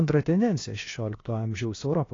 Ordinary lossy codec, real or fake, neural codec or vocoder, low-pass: AAC, 32 kbps; fake; codec, 16 kHz, about 1 kbps, DyCAST, with the encoder's durations; 7.2 kHz